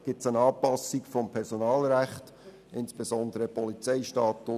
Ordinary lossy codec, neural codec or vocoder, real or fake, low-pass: none; none; real; 14.4 kHz